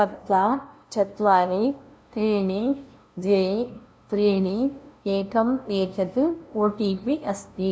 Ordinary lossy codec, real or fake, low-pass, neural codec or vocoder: none; fake; none; codec, 16 kHz, 0.5 kbps, FunCodec, trained on LibriTTS, 25 frames a second